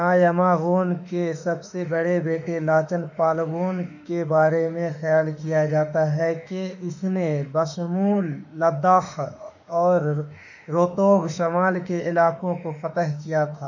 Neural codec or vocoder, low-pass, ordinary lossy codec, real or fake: autoencoder, 48 kHz, 32 numbers a frame, DAC-VAE, trained on Japanese speech; 7.2 kHz; none; fake